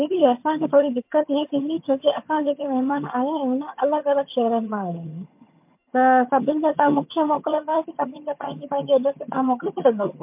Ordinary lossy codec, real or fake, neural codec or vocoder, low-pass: MP3, 32 kbps; fake; vocoder, 22.05 kHz, 80 mel bands, HiFi-GAN; 3.6 kHz